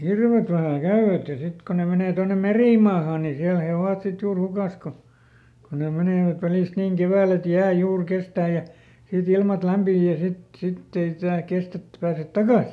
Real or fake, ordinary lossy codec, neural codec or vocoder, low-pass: real; none; none; none